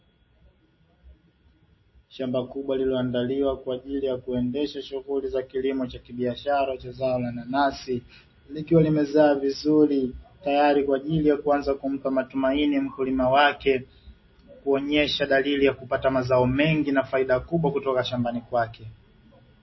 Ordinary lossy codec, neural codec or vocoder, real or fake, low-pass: MP3, 24 kbps; none; real; 7.2 kHz